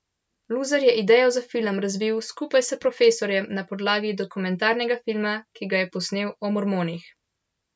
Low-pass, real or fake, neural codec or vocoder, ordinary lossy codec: none; real; none; none